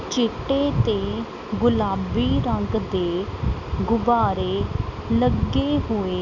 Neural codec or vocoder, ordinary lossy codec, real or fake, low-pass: none; none; real; 7.2 kHz